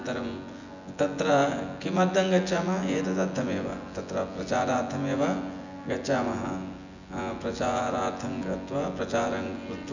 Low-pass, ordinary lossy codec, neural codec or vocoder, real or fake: 7.2 kHz; none; vocoder, 24 kHz, 100 mel bands, Vocos; fake